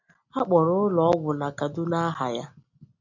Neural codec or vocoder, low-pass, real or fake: none; 7.2 kHz; real